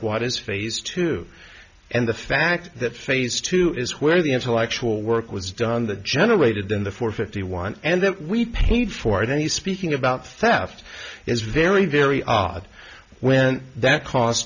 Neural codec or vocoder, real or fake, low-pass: none; real; 7.2 kHz